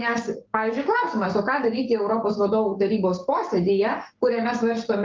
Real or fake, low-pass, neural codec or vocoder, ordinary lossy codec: fake; 7.2 kHz; codec, 44.1 kHz, 7.8 kbps, Pupu-Codec; Opus, 24 kbps